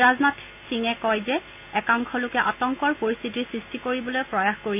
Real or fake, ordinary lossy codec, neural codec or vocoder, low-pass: real; none; none; 3.6 kHz